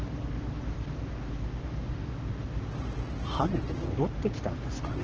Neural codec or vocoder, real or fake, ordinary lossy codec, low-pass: codec, 44.1 kHz, 7.8 kbps, Pupu-Codec; fake; Opus, 16 kbps; 7.2 kHz